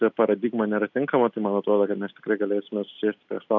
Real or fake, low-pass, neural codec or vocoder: real; 7.2 kHz; none